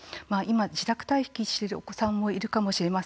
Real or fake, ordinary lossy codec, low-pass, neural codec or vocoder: real; none; none; none